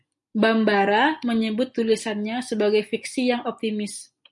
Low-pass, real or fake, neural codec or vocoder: 10.8 kHz; real; none